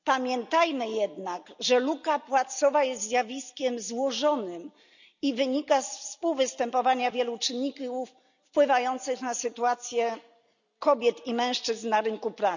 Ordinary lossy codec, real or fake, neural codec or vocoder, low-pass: none; real; none; 7.2 kHz